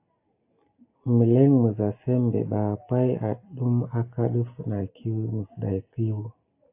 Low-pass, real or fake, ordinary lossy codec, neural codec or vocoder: 3.6 kHz; real; AAC, 24 kbps; none